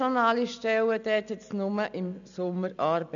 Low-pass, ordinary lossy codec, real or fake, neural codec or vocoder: 7.2 kHz; none; real; none